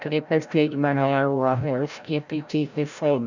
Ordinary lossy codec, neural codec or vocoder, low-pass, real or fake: none; codec, 16 kHz, 0.5 kbps, FreqCodec, larger model; 7.2 kHz; fake